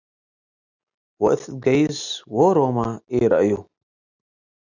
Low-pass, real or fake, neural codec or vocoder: 7.2 kHz; real; none